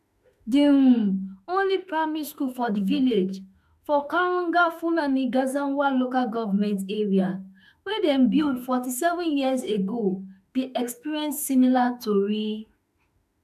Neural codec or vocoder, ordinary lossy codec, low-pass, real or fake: autoencoder, 48 kHz, 32 numbers a frame, DAC-VAE, trained on Japanese speech; none; 14.4 kHz; fake